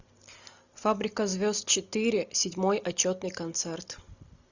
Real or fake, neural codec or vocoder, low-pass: real; none; 7.2 kHz